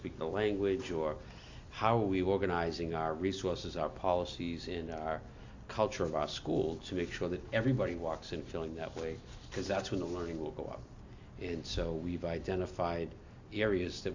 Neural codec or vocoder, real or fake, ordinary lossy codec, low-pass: none; real; AAC, 48 kbps; 7.2 kHz